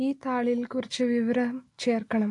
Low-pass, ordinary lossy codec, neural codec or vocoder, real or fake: 10.8 kHz; AAC, 48 kbps; none; real